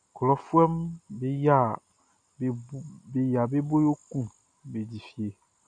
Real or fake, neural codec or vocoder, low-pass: real; none; 9.9 kHz